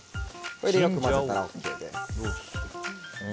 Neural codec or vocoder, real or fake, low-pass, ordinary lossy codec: none; real; none; none